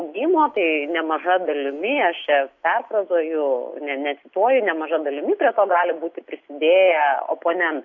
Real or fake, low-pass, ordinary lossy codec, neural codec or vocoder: real; 7.2 kHz; AAC, 48 kbps; none